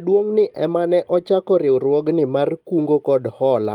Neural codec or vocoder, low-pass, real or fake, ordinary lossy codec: none; 19.8 kHz; real; Opus, 32 kbps